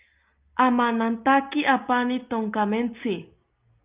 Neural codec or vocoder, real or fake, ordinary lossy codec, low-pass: none; real; Opus, 24 kbps; 3.6 kHz